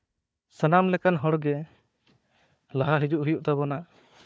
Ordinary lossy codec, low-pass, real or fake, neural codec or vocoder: none; none; fake; codec, 16 kHz, 4 kbps, FunCodec, trained on Chinese and English, 50 frames a second